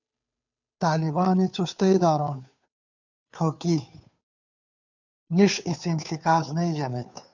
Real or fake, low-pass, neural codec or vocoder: fake; 7.2 kHz; codec, 16 kHz, 2 kbps, FunCodec, trained on Chinese and English, 25 frames a second